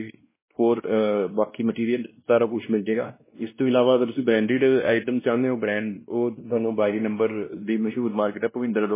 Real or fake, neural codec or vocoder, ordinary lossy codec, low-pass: fake; codec, 16 kHz, 1 kbps, X-Codec, WavLM features, trained on Multilingual LibriSpeech; MP3, 16 kbps; 3.6 kHz